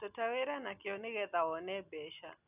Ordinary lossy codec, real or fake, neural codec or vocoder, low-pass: none; real; none; 3.6 kHz